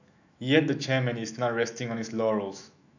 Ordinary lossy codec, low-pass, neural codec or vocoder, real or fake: none; 7.2 kHz; autoencoder, 48 kHz, 128 numbers a frame, DAC-VAE, trained on Japanese speech; fake